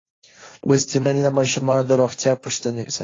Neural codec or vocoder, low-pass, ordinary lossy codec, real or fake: codec, 16 kHz, 1.1 kbps, Voila-Tokenizer; 7.2 kHz; AAC, 32 kbps; fake